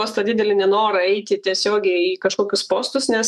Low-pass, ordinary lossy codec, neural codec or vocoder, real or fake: 14.4 kHz; MP3, 96 kbps; autoencoder, 48 kHz, 128 numbers a frame, DAC-VAE, trained on Japanese speech; fake